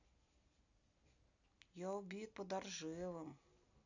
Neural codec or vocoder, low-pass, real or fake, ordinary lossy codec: none; 7.2 kHz; real; none